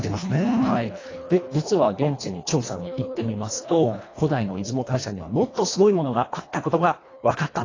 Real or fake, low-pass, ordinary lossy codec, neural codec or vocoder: fake; 7.2 kHz; AAC, 32 kbps; codec, 24 kHz, 1.5 kbps, HILCodec